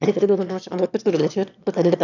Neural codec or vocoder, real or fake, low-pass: autoencoder, 22.05 kHz, a latent of 192 numbers a frame, VITS, trained on one speaker; fake; 7.2 kHz